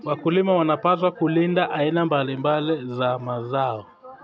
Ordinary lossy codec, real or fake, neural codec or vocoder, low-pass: none; real; none; none